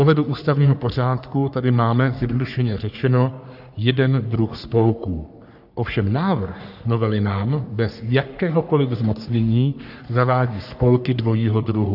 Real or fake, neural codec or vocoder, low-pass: fake; codec, 44.1 kHz, 3.4 kbps, Pupu-Codec; 5.4 kHz